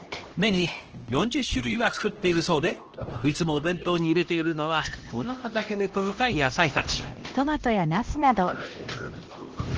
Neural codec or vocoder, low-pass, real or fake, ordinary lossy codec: codec, 16 kHz, 1 kbps, X-Codec, HuBERT features, trained on LibriSpeech; 7.2 kHz; fake; Opus, 16 kbps